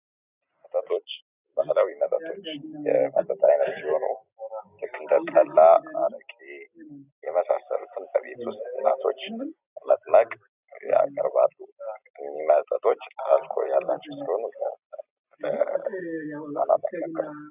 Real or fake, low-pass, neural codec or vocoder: real; 3.6 kHz; none